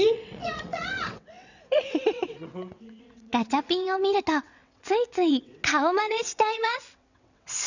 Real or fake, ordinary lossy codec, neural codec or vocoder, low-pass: fake; none; vocoder, 22.05 kHz, 80 mel bands, WaveNeXt; 7.2 kHz